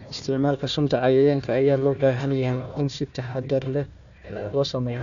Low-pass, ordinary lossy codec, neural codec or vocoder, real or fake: 7.2 kHz; none; codec, 16 kHz, 1 kbps, FunCodec, trained on Chinese and English, 50 frames a second; fake